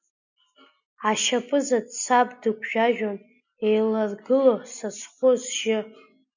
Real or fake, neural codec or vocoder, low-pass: real; none; 7.2 kHz